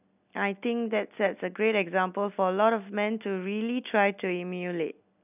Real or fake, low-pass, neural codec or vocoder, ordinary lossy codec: real; 3.6 kHz; none; none